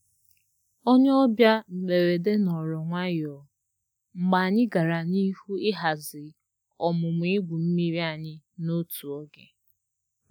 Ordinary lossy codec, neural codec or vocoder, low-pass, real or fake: none; none; 19.8 kHz; real